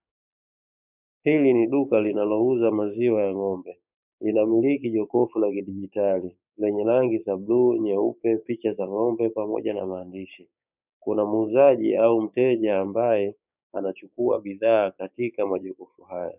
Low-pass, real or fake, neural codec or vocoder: 3.6 kHz; fake; codec, 16 kHz, 6 kbps, DAC